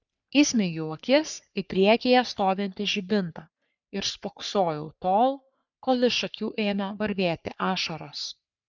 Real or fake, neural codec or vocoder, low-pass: fake; codec, 44.1 kHz, 3.4 kbps, Pupu-Codec; 7.2 kHz